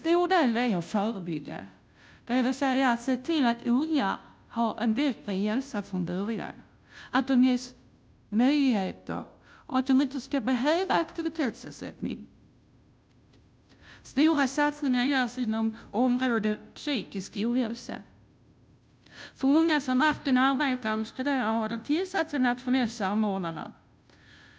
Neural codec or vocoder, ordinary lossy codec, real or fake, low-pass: codec, 16 kHz, 0.5 kbps, FunCodec, trained on Chinese and English, 25 frames a second; none; fake; none